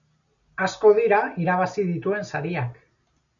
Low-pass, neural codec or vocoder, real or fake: 7.2 kHz; none; real